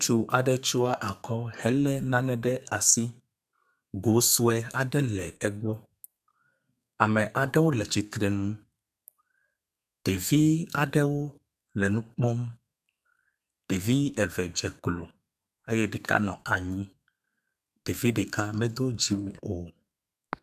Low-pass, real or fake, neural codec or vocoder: 14.4 kHz; fake; codec, 32 kHz, 1.9 kbps, SNAC